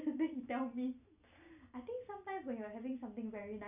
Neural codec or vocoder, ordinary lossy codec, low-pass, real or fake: none; none; 3.6 kHz; real